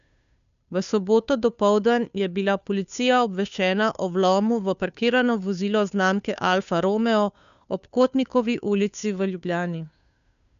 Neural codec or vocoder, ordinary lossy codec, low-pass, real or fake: codec, 16 kHz, 2 kbps, FunCodec, trained on Chinese and English, 25 frames a second; none; 7.2 kHz; fake